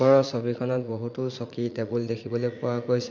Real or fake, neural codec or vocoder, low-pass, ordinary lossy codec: fake; vocoder, 44.1 kHz, 80 mel bands, Vocos; 7.2 kHz; none